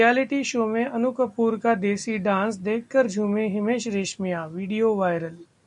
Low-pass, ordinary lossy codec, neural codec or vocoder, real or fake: 10.8 kHz; MP3, 96 kbps; none; real